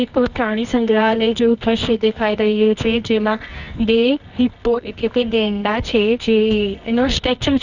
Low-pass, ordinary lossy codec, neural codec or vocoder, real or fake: 7.2 kHz; none; codec, 24 kHz, 0.9 kbps, WavTokenizer, medium music audio release; fake